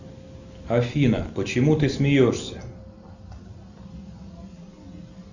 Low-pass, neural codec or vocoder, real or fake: 7.2 kHz; none; real